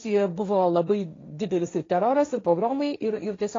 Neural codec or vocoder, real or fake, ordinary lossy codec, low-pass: codec, 16 kHz, 1.1 kbps, Voila-Tokenizer; fake; AAC, 32 kbps; 7.2 kHz